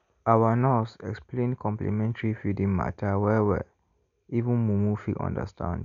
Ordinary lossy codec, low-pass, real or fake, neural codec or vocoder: none; 7.2 kHz; real; none